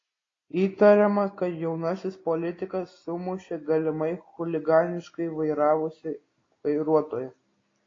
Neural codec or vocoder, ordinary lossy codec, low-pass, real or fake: none; AAC, 32 kbps; 7.2 kHz; real